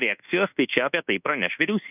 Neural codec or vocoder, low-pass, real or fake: codec, 24 kHz, 1.2 kbps, DualCodec; 3.6 kHz; fake